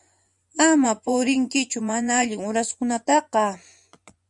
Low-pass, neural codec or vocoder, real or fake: 10.8 kHz; vocoder, 24 kHz, 100 mel bands, Vocos; fake